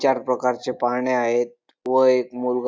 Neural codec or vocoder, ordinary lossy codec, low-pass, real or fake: none; none; none; real